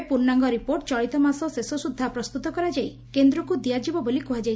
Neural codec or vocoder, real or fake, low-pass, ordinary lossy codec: none; real; none; none